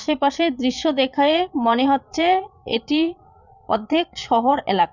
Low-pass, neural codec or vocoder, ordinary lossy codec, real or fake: 7.2 kHz; vocoder, 22.05 kHz, 80 mel bands, Vocos; none; fake